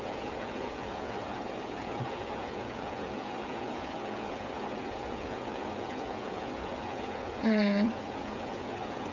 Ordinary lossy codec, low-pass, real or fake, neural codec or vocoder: none; 7.2 kHz; fake; codec, 16 kHz, 8 kbps, FreqCodec, smaller model